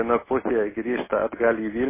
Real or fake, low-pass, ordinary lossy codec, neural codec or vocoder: real; 3.6 kHz; MP3, 16 kbps; none